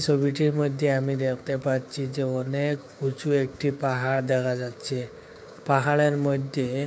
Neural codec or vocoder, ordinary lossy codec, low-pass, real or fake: codec, 16 kHz, 6 kbps, DAC; none; none; fake